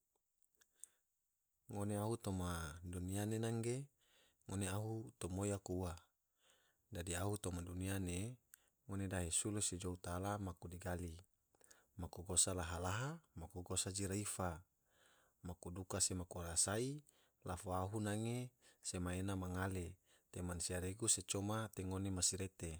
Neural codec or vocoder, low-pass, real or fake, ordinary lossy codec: none; none; real; none